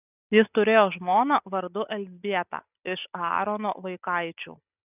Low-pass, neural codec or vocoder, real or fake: 3.6 kHz; none; real